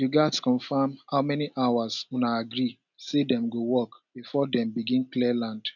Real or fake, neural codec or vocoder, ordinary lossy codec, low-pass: real; none; none; 7.2 kHz